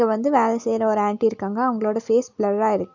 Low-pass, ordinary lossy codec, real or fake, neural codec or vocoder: 7.2 kHz; none; real; none